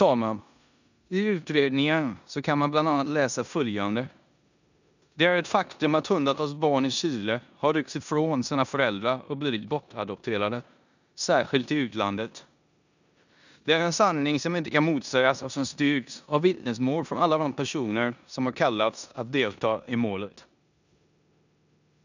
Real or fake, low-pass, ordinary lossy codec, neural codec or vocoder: fake; 7.2 kHz; none; codec, 16 kHz in and 24 kHz out, 0.9 kbps, LongCat-Audio-Codec, four codebook decoder